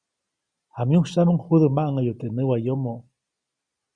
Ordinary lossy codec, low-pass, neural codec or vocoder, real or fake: Opus, 64 kbps; 9.9 kHz; none; real